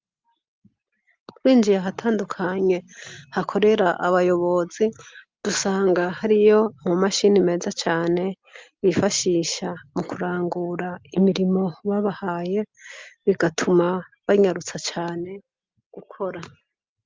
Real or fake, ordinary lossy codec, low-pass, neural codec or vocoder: real; Opus, 24 kbps; 7.2 kHz; none